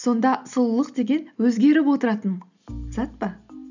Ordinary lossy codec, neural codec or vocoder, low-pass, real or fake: none; none; 7.2 kHz; real